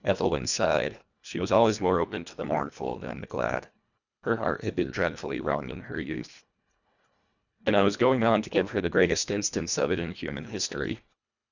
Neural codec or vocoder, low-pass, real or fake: codec, 24 kHz, 1.5 kbps, HILCodec; 7.2 kHz; fake